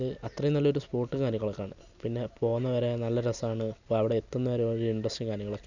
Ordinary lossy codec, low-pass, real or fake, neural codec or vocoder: none; 7.2 kHz; real; none